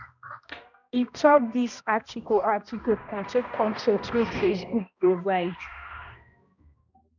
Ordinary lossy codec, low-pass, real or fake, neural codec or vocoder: none; 7.2 kHz; fake; codec, 16 kHz, 1 kbps, X-Codec, HuBERT features, trained on balanced general audio